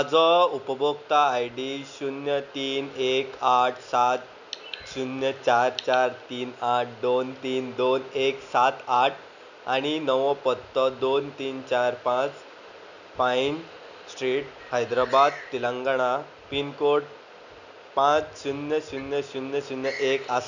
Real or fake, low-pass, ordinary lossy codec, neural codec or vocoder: real; 7.2 kHz; none; none